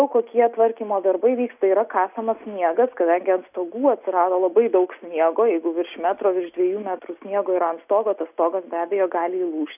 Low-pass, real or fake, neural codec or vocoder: 3.6 kHz; real; none